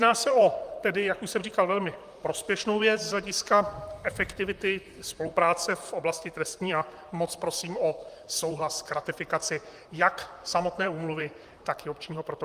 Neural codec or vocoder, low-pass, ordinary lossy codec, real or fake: vocoder, 44.1 kHz, 128 mel bands, Pupu-Vocoder; 14.4 kHz; Opus, 32 kbps; fake